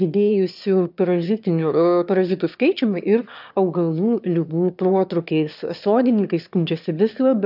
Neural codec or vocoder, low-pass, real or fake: autoencoder, 22.05 kHz, a latent of 192 numbers a frame, VITS, trained on one speaker; 5.4 kHz; fake